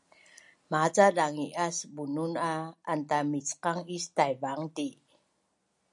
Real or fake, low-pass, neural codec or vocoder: real; 10.8 kHz; none